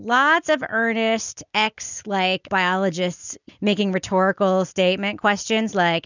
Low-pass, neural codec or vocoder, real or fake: 7.2 kHz; none; real